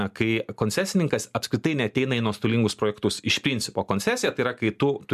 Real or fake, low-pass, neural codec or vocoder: real; 14.4 kHz; none